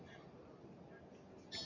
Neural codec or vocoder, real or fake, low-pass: none; real; 7.2 kHz